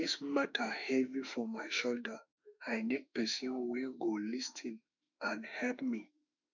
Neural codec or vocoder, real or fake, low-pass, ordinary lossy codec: autoencoder, 48 kHz, 32 numbers a frame, DAC-VAE, trained on Japanese speech; fake; 7.2 kHz; none